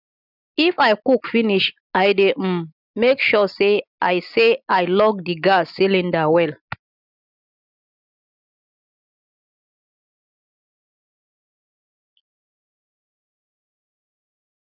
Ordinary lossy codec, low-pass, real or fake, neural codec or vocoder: none; 5.4 kHz; real; none